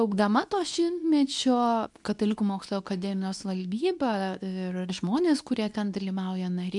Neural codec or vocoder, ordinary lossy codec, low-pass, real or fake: codec, 24 kHz, 0.9 kbps, WavTokenizer, medium speech release version 2; MP3, 96 kbps; 10.8 kHz; fake